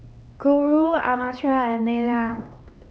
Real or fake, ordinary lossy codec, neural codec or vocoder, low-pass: fake; none; codec, 16 kHz, 2 kbps, X-Codec, HuBERT features, trained on LibriSpeech; none